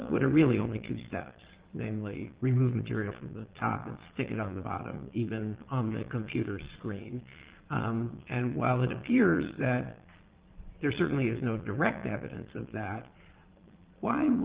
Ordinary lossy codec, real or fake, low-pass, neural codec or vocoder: Opus, 16 kbps; fake; 3.6 kHz; vocoder, 22.05 kHz, 80 mel bands, Vocos